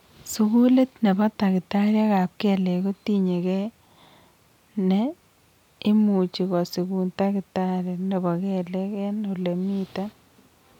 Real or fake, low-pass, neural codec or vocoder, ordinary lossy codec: real; 19.8 kHz; none; none